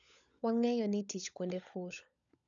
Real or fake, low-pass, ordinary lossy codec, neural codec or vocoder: fake; 7.2 kHz; none; codec, 16 kHz, 16 kbps, FunCodec, trained on LibriTTS, 50 frames a second